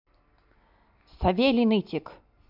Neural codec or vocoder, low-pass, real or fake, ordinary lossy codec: none; 5.4 kHz; real; none